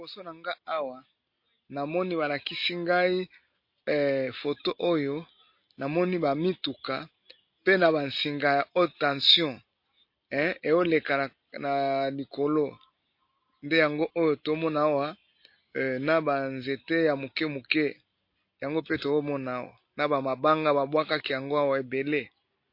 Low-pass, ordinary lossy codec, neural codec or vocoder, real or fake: 5.4 kHz; MP3, 32 kbps; none; real